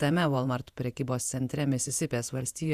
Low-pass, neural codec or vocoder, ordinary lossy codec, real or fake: 14.4 kHz; vocoder, 48 kHz, 128 mel bands, Vocos; Opus, 64 kbps; fake